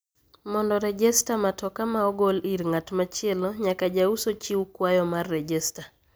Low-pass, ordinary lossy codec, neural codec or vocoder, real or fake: none; none; none; real